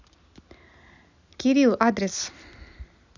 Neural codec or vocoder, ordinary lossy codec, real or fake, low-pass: none; none; real; 7.2 kHz